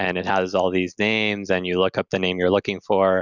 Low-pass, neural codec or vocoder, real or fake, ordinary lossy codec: 7.2 kHz; none; real; Opus, 64 kbps